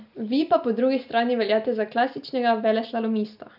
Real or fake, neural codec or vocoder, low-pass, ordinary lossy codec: real; none; 5.4 kHz; none